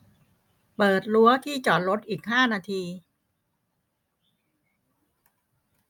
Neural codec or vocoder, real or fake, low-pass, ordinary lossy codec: none; real; 19.8 kHz; none